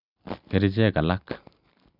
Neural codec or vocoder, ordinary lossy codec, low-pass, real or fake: none; none; 5.4 kHz; real